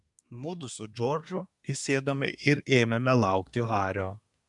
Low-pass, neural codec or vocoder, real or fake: 10.8 kHz; codec, 24 kHz, 1 kbps, SNAC; fake